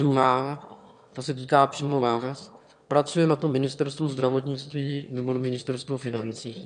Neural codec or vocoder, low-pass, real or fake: autoencoder, 22.05 kHz, a latent of 192 numbers a frame, VITS, trained on one speaker; 9.9 kHz; fake